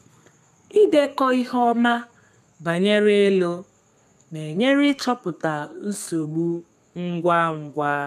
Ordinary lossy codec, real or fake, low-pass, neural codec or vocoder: MP3, 96 kbps; fake; 14.4 kHz; codec, 32 kHz, 1.9 kbps, SNAC